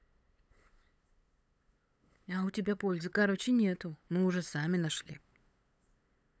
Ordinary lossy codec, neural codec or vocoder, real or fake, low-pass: none; codec, 16 kHz, 8 kbps, FunCodec, trained on LibriTTS, 25 frames a second; fake; none